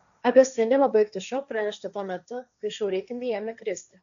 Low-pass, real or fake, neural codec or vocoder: 7.2 kHz; fake; codec, 16 kHz, 1.1 kbps, Voila-Tokenizer